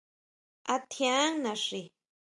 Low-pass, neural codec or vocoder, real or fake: 10.8 kHz; none; real